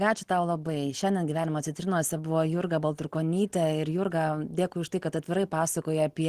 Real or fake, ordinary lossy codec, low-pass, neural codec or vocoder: real; Opus, 16 kbps; 14.4 kHz; none